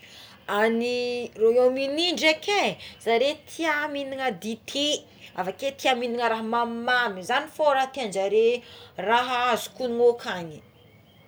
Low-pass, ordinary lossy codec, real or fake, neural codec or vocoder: none; none; real; none